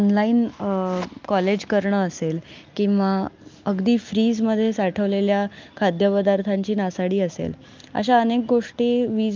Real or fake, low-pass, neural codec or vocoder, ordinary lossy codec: real; 7.2 kHz; none; Opus, 24 kbps